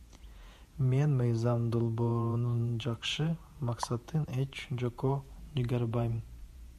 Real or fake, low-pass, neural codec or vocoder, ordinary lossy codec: fake; 14.4 kHz; vocoder, 48 kHz, 128 mel bands, Vocos; MP3, 96 kbps